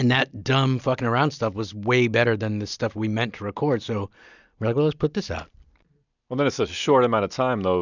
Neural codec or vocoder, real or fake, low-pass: none; real; 7.2 kHz